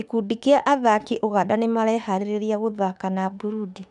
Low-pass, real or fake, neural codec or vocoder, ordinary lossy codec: 10.8 kHz; fake; autoencoder, 48 kHz, 32 numbers a frame, DAC-VAE, trained on Japanese speech; none